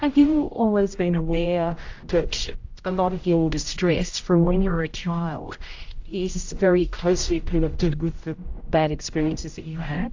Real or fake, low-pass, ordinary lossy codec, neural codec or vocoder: fake; 7.2 kHz; AAC, 48 kbps; codec, 16 kHz, 0.5 kbps, X-Codec, HuBERT features, trained on general audio